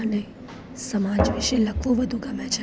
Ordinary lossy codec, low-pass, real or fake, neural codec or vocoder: none; none; real; none